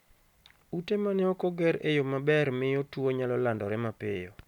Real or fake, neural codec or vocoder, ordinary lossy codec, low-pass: real; none; none; 19.8 kHz